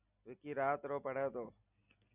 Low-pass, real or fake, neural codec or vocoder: 3.6 kHz; real; none